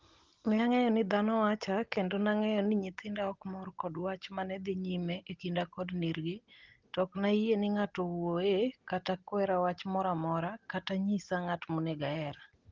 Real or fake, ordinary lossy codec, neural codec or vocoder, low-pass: real; Opus, 16 kbps; none; 7.2 kHz